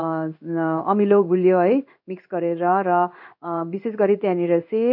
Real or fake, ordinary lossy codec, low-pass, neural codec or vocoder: fake; none; 5.4 kHz; codec, 16 kHz in and 24 kHz out, 1 kbps, XY-Tokenizer